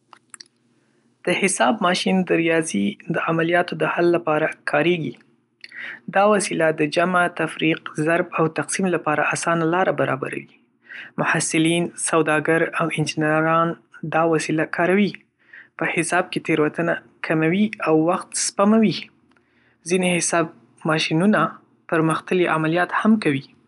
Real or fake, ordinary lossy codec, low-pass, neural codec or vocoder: real; none; 10.8 kHz; none